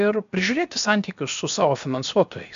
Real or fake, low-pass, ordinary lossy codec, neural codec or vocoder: fake; 7.2 kHz; MP3, 64 kbps; codec, 16 kHz, 0.7 kbps, FocalCodec